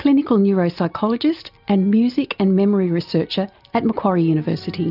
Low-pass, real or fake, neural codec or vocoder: 5.4 kHz; real; none